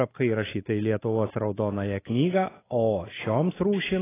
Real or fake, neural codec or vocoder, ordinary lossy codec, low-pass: real; none; AAC, 16 kbps; 3.6 kHz